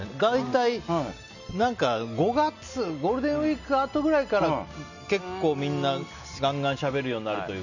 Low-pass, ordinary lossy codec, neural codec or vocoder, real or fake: 7.2 kHz; none; none; real